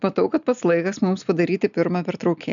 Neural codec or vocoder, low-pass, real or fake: none; 7.2 kHz; real